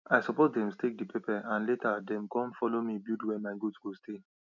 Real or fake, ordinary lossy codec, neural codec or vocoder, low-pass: real; none; none; 7.2 kHz